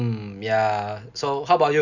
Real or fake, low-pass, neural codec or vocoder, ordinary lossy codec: real; 7.2 kHz; none; none